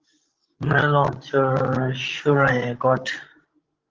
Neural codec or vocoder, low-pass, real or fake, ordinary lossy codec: codec, 16 kHz, 16 kbps, FreqCodec, larger model; 7.2 kHz; fake; Opus, 16 kbps